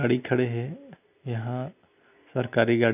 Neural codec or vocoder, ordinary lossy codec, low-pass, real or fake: none; none; 3.6 kHz; real